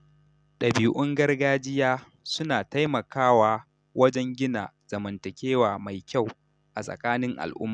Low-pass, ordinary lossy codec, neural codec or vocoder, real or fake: 9.9 kHz; none; none; real